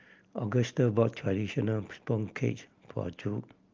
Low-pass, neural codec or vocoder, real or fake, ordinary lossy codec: 7.2 kHz; none; real; Opus, 32 kbps